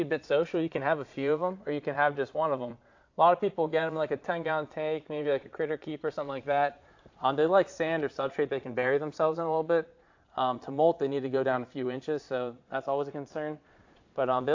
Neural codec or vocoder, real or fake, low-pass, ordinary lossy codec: vocoder, 22.05 kHz, 80 mel bands, Vocos; fake; 7.2 kHz; AAC, 48 kbps